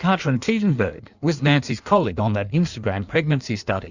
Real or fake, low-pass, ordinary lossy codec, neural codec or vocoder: fake; 7.2 kHz; Opus, 64 kbps; codec, 16 kHz in and 24 kHz out, 1.1 kbps, FireRedTTS-2 codec